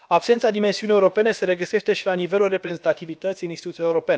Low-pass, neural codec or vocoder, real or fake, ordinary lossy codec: none; codec, 16 kHz, about 1 kbps, DyCAST, with the encoder's durations; fake; none